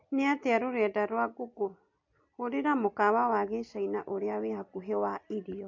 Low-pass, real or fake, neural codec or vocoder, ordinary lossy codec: 7.2 kHz; real; none; MP3, 48 kbps